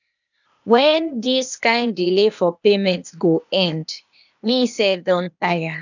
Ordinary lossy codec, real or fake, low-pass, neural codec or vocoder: none; fake; 7.2 kHz; codec, 16 kHz, 0.8 kbps, ZipCodec